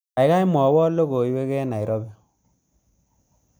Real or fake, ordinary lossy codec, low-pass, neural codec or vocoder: real; none; none; none